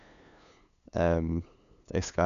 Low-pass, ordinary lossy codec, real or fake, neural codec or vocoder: 7.2 kHz; none; fake; codec, 16 kHz, 2 kbps, FunCodec, trained on LibriTTS, 25 frames a second